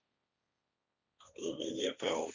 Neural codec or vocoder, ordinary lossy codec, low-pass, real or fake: codec, 16 kHz, 1.1 kbps, Voila-Tokenizer; Opus, 64 kbps; 7.2 kHz; fake